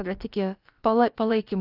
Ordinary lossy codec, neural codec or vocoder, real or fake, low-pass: Opus, 24 kbps; codec, 16 kHz, about 1 kbps, DyCAST, with the encoder's durations; fake; 5.4 kHz